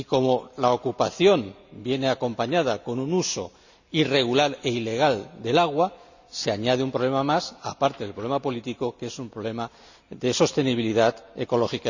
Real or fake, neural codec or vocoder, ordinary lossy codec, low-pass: real; none; none; 7.2 kHz